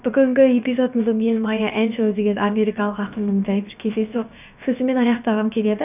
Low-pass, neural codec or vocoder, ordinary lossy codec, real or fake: 3.6 kHz; codec, 16 kHz, about 1 kbps, DyCAST, with the encoder's durations; none; fake